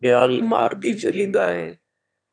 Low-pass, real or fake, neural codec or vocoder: 9.9 kHz; fake; autoencoder, 22.05 kHz, a latent of 192 numbers a frame, VITS, trained on one speaker